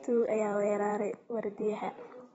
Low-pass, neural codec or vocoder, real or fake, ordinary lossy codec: 19.8 kHz; vocoder, 44.1 kHz, 128 mel bands every 512 samples, BigVGAN v2; fake; AAC, 24 kbps